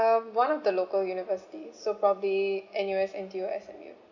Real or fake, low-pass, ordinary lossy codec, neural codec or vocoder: real; 7.2 kHz; none; none